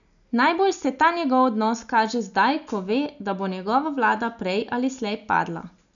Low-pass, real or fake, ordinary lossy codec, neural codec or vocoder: 7.2 kHz; real; none; none